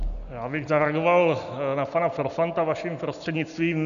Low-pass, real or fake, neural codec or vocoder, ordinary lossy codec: 7.2 kHz; real; none; MP3, 96 kbps